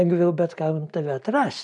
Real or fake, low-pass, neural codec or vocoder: real; 10.8 kHz; none